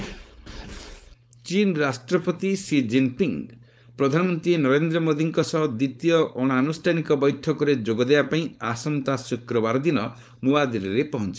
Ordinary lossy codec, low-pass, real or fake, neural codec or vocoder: none; none; fake; codec, 16 kHz, 4.8 kbps, FACodec